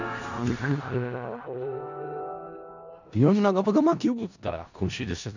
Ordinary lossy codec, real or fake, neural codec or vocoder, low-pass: none; fake; codec, 16 kHz in and 24 kHz out, 0.4 kbps, LongCat-Audio-Codec, four codebook decoder; 7.2 kHz